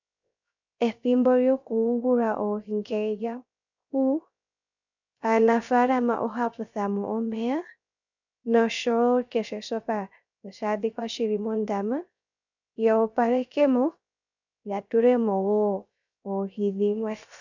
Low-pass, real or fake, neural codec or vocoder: 7.2 kHz; fake; codec, 16 kHz, 0.3 kbps, FocalCodec